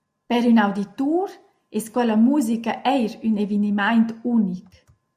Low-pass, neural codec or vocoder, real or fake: 14.4 kHz; none; real